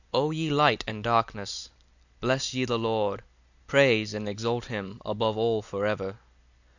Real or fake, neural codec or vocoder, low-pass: real; none; 7.2 kHz